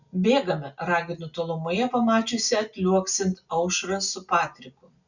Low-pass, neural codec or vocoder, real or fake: 7.2 kHz; none; real